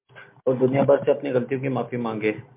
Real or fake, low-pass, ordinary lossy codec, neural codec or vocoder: real; 3.6 kHz; MP3, 32 kbps; none